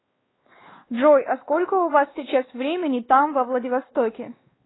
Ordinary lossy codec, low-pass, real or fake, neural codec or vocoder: AAC, 16 kbps; 7.2 kHz; fake; codec, 16 kHz, 2 kbps, X-Codec, WavLM features, trained on Multilingual LibriSpeech